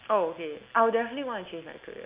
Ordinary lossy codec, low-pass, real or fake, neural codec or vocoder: Opus, 24 kbps; 3.6 kHz; real; none